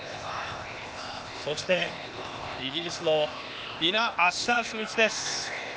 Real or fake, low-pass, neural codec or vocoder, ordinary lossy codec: fake; none; codec, 16 kHz, 0.8 kbps, ZipCodec; none